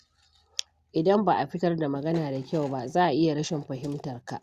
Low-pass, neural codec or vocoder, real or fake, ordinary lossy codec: 14.4 kHz; none; real; Opus, 64 kbps